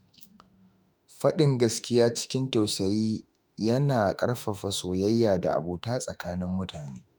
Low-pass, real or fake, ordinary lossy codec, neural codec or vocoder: none; fake; none; autoencoder, 48 kHz, 32 numbers a frame, DAC-VAE, trained on Japanese speech